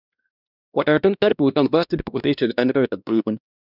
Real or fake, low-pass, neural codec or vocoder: fake; 5.4 kHz; codec, 16 kHz, 1 kbps, X-Codec, WavLM features, trained on Multilingual LibriSpeech